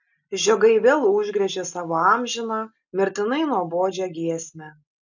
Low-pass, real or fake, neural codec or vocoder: 7.2 kHz; real; none